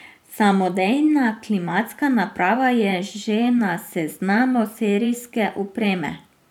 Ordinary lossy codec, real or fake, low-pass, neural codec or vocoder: none; fake; 19.8 kHz; vocoder, 44.1 kHz, 128 mel bands every 512 samples, BigVGAN v2